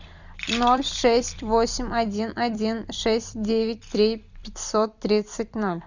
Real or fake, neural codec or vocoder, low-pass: fake; vocoder, 44.1 kHz, 128 mel bands every 256 samples, BigVGAN v2; 7.2 kHz